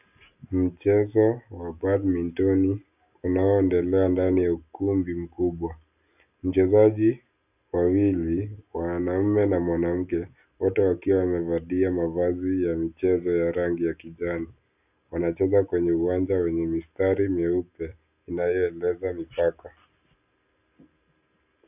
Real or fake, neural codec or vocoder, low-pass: real; none; 3.6 kHz